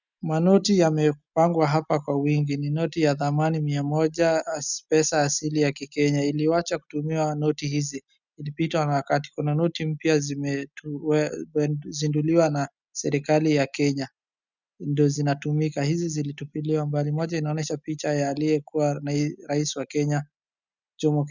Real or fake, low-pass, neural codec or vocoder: real; 7.2 kHz; none